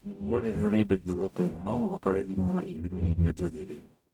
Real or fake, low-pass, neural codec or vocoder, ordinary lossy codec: fake; 19.8 kHz; codec, 44.1 kHz, 0.9 kbps, DAC; none